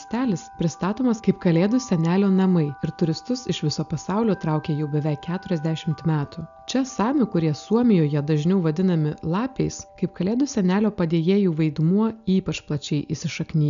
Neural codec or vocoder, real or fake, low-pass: none; real; 7.2 kHz